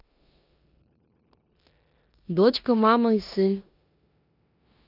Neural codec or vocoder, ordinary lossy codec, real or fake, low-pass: codec, 16 kHz in and 24 kHz out, 0.9 kbps, LongCat-Audio-Codec, four codebook decoder; none; fake; 5.4 kHz